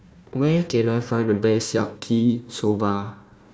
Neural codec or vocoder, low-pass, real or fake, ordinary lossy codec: codec, 16 kHz, 1 kbps, FunCodec, trained on Chinese and English, 50 frames a second; none; fake; none